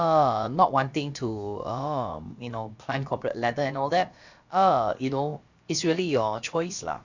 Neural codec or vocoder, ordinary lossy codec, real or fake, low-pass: codec, 16 kHz, about 1 kbps, DyCAST, with the encoder's durations; Opus, 64 kbps; fake; 7.2 kHz